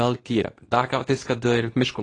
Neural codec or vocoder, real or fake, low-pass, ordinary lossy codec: codec, 24 kHz, 0.9 kbps, WavTokenizer, medium speech release version 2; fake; 10.8 kHz; AAC, 32 kbps